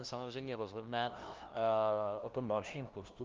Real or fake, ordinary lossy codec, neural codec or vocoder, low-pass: fake; Opus, 32 kbps; codec, 16 kHz, 0.5 kbps, FunCodec, trained on LibriTTS, 25 frames a second; 7.2 kHz